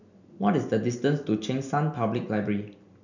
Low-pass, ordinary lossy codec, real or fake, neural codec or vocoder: 7.2 kHz; none; real; none